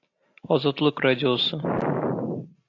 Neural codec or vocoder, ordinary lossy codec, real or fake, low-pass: none; AAC, 48 kbps; real; 7.2 kHz